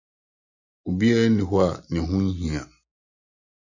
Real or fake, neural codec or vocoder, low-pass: real; none; 7.2 kHz